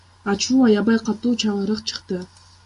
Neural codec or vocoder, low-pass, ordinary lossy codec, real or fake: none; 10.8 kHz; MP3, 64 kbps; real